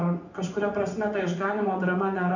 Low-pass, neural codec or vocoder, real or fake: 7.2 kHz; vocoder, 24 kHz, 100 mel bands, Vocos; fake